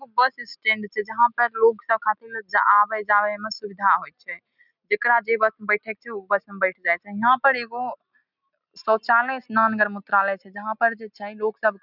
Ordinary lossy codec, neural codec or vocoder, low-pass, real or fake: none; none; 5.4 kHz; real